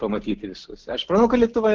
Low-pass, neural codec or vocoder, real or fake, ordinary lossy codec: 7.2 kHz; none; real; Opus, 16 kbps